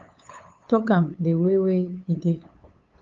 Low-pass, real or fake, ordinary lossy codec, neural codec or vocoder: 7.2 kHz; fake; Opus, 32 kbps; codec, 16 kHz, 8 kbps, FunCodec, trained on LibriTTS, 25 frames a second